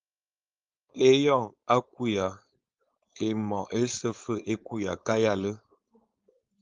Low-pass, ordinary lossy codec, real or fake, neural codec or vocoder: 7.2 kHz; Opus, 32 kbps; fake; codec, 16 kHz, 4.8 kbps, FACodec